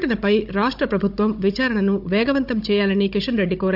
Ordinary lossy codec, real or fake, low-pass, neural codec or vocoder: AAC, 48 kbps; fake; 5.4 kHz; codec, 16 kHz, 16 kbps, FunCodec, trained on Chinese and English, 50 frames a second